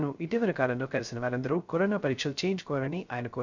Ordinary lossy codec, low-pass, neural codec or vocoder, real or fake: none; 7.2 kHz; codec, 16 kHz, 0.3 kbps, FocalCodec; fake